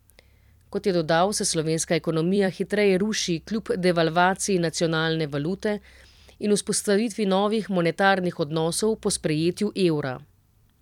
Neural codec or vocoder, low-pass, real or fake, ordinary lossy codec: vocoder, 44.1 kHz, 128 mel bands every 256 samples, BigVGAN v2; 19.8 kHz; fake; none